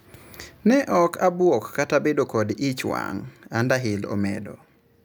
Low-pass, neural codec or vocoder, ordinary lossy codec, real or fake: none; none; none; real